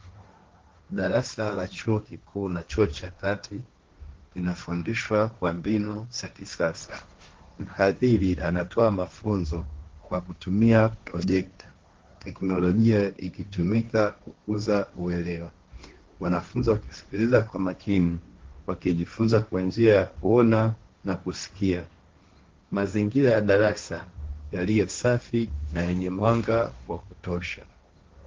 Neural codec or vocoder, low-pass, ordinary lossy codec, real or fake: codec, 16 kHz, 1.1 kbps, Voila-Tokenizer; 7.2 kHz; Opus, 16 kbps; fake